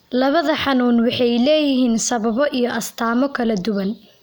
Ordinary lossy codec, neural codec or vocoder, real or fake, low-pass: none; none; real; none